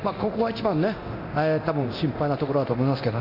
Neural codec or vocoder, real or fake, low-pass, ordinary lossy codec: codec, 24 kHz, 0.9 kbps, DualCodec; fake; 5.4 kHz; none